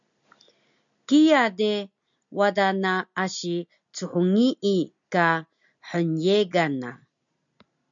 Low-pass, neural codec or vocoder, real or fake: 7.2 kHz; none; real